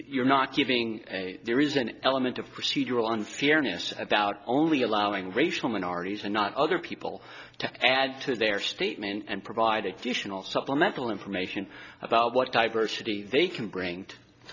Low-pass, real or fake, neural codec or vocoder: 7.2 kHz; real; none